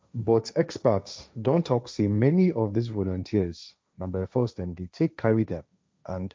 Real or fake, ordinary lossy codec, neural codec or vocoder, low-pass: fake; none; codec, 16 kHz, 1.1 kbps, Voila-Tokenizer; none